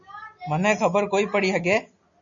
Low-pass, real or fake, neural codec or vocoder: 7.2 kHz; real; none